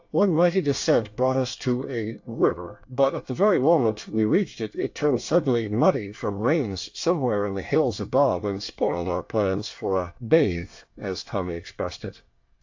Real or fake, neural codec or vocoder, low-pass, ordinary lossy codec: fake; codec, 24 kHz, 1 kbps, SNAC; 7.2 kHz; AAC, 48 kbps